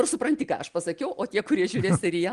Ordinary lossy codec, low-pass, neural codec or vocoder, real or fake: Opus, 24 kbps; 10.8 kHz; none; real